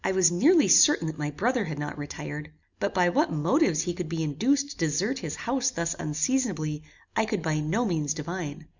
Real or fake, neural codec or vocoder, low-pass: real; none; 7.2 kHz